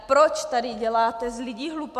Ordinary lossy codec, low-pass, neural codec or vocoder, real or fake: MP3, 96 kbps; 14.4 kHz; none; real